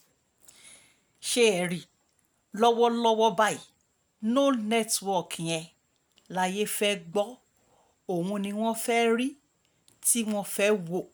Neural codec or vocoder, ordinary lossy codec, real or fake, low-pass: none; none; real; none